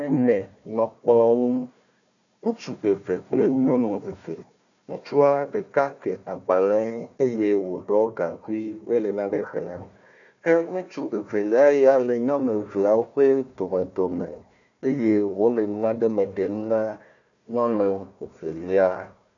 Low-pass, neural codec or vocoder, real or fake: 7.2 kHz; codec, 16 kHz, 1 kbps, FunCodec, trained on Chinese and English, 50 frames a second; fake